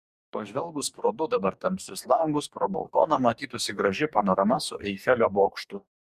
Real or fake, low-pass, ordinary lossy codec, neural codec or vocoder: fake; 14.4 kHz; Opus, 64 kbps; codec, 44.1 kHz, 2.6 kbps, DAC